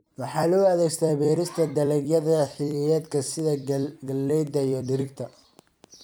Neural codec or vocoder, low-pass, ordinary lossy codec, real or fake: vocoder, 44.1 kHz, 128 mel bands every 256 samples, BigVGAN v2; none; none; fake